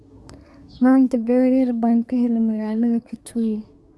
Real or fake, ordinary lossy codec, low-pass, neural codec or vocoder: fake; none; none; codec, 24 kHz, 1 kbps, SNAC